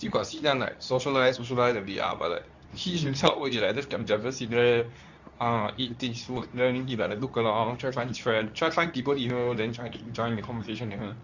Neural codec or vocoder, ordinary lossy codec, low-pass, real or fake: codec, 24 kHz, 0.9 kbps, WavTokenizer, medium speech release version 2; none; 7.2 kHz; fake